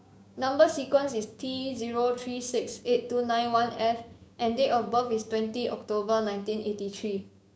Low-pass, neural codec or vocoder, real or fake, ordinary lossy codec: none; codec, 16 kHz, 6 kbps, DAC; fake; none